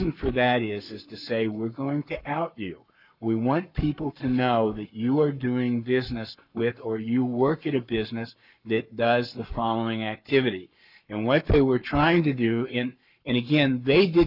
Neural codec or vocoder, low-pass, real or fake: codec, 44.1 kHz, 7.8 kbps, Pupu-Codec; 5.4 kHz; fake